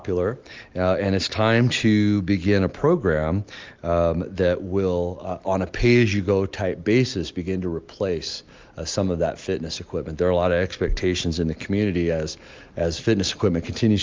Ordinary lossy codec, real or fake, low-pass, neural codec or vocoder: Opus, 32 kbps; real; 7.2 kHz; none